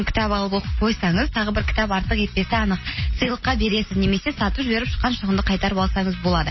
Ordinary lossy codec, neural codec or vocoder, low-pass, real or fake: MP3, 24 kbps; none; 7.2 kHz; real